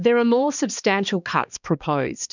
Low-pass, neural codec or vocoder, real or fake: 7.2 kHz; codec, 16 kHz, 2 kbps, X-Codec, HuBERT features, trained on balanced general audio; fake